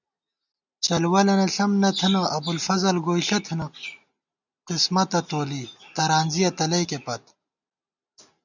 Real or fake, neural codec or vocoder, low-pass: real; none; 7.2 kHz